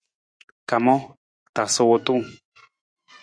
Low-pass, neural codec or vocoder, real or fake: 9.9 kHz; vocoder, 24 kHz, 100 mel bands, Vocos; fake